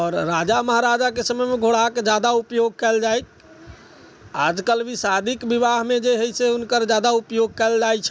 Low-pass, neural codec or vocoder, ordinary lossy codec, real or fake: none; none; none; real